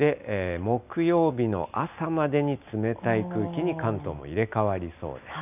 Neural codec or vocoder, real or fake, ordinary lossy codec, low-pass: none; real; none; 3.6 kHz